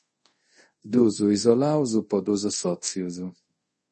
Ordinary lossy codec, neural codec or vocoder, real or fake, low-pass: MP3, 32 kbps; codec, 24 kHz, 0.5 kbps, DualCodec; fake; 10.8 kHz